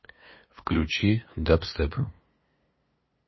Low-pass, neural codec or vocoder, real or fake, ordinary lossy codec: 7.2 kHz; codec, 16 kHz, 2 kbps, FunCodec, trained on LibriTTS, 25 frames a second; fake; MP3, 24 kbps